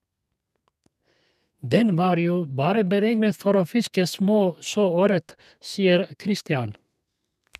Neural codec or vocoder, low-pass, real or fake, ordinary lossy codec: codec, 32 kHz, 1.9 kbps, SNAC; 14.4 kHz; fake; none